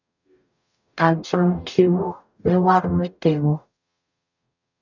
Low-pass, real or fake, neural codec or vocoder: 7.2 kHz; fake; codec, 44.1 kHz, 0.9 kbps, DAC